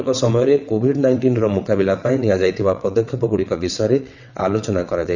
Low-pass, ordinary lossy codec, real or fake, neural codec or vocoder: 7.2 kHz; none; fake; vocoder, 22.05 kHz, 80 mel bands, WaveNeXt